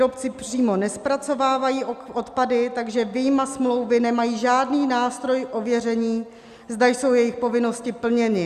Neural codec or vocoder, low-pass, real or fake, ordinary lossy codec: none; 14.4 kHz; real; Opus, 64 kbps